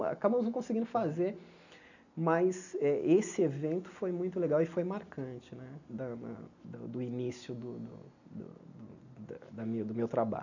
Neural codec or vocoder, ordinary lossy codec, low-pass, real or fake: none; none; 7.2 kHz; real